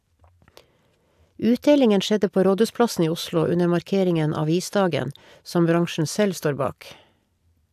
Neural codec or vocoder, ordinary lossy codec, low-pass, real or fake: none; AAC, 96 kbps; 14.4 kHz; real